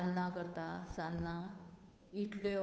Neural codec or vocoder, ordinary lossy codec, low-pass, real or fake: codec, 16 kHz, 2 kbps, FunCodec, trained on Chinese and English, 25 frames a second; none; none; fake